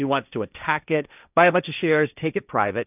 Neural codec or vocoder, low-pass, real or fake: codec, 16 kHz, 1.1 kbps, Voila-Tokenizer; 3.6 kHz; fake